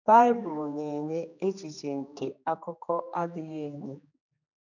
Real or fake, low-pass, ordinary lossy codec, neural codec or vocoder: fake; 7.2 kHz; none; codec, 16 kHz, 2 kbps, X-Codec, HuBERT features, trained on general audio